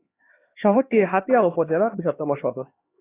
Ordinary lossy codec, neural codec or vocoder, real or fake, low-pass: AAC, 16 kbps; codec, 16 kHz, 2 kbps, X-Codec, HuBERT features, trained on LibriSpeech; fake; 3.6 kHz